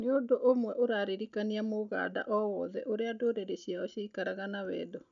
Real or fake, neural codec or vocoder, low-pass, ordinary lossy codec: real; none; 7.2 kHz; none